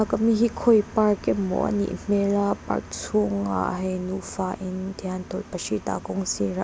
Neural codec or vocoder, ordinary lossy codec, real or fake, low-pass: none; none; real; none